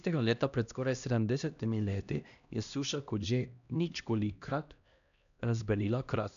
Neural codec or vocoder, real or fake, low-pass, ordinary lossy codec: codec, 16 kHz, 1 kbps, X-Codec, HuBERT features, trained on LibriSpeech; fake; 7.2 kHz; none